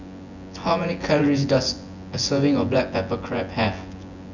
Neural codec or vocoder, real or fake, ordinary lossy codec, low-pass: vocoder, 24 kHz, 100 mel bands, Vocos; fake; none; 7.2 kHz